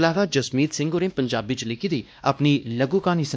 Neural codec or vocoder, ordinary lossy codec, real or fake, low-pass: codec, 16 kHz, 1 kbps, X-Codec, WavLM features, trained on Multilingual LibriSpeech; none; fake; none